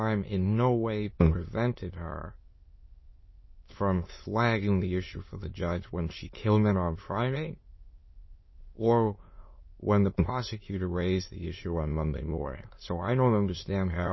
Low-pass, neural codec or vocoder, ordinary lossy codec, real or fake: 7.2 kHz; autoencoder, 22.05 kHz, a latent of 192 numbers a frame, VITS, trained on many speakers; MP3, 24 kbps; fake